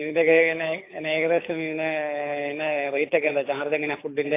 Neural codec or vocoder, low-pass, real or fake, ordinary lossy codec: codec, 24 kHz, 6 kbps, HILCodec; 3.6 kHz; fake; AAC, 24 kbps